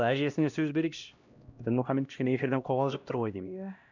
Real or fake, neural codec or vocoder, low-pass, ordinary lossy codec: fake; codec, 16 kHz, 1 kbps, X-Codec, HuBERT features, trained on LibriSpeech; 7.2 kHz; none